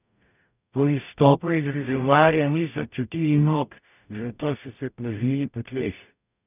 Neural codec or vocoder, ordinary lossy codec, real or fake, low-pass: codec, 44.1 kHz, 0.9 kbps, DAC; none; fake; 3.6 kHz